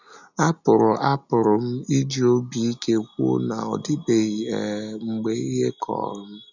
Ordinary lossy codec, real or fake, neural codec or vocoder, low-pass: none; real; none; 7.2 kHz